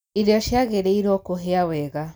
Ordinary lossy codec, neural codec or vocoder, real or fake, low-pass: none; none; real; none